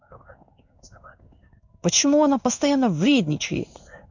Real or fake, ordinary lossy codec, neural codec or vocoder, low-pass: fake; none; codec, 16 kHz in and 24 kHz out, 1 kbps, XY-Tokenizer; 7.2 kHz